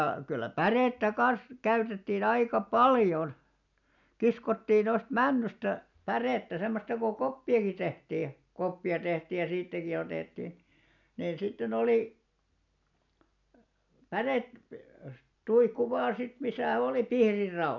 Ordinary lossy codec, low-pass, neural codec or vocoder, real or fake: none; 7.2 kHz; none; real